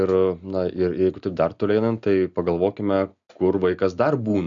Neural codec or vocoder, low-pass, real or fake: none; 7.2 kHz; real